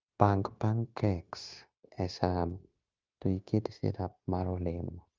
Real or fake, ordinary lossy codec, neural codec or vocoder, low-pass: fake; Opus, 32 kbps; codec, 16 kHz, 0.9 kbps, LongCat-Audio-Codec; 7.2 kHz